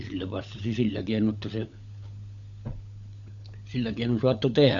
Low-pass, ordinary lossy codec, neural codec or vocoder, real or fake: 7.2 kHz; none; codec, 16 kHz, 16 kbps, FunCodec, trained on LibriTTS, 50 frames a second; fake